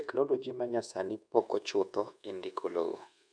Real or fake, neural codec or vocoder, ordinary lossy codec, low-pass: fake; codec, 24 kHz, 1.2 kbps, DualCodec; none; 9.9 kHz